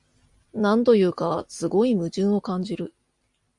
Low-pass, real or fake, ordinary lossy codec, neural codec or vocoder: 10.8 kHz; real; Opus, 64 kbps; none